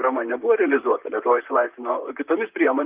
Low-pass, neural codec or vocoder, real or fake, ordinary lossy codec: 3.6 kHz; vocoder, 44.1 kHz, 128 mel bands, Pupu-Vocoder; fake; Opus, 24 kbps